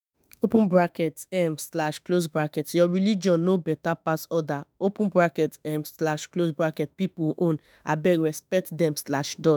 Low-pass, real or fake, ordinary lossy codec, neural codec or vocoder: none; fake; none; autoencoder, 48 kHz, 32 numbers a frame, DAC-VAE, trained on Japanese speech